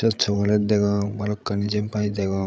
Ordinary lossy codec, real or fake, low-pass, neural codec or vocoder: none; fake; none; codec, 16 kHz, 16 kbps, FreqCodec, larger model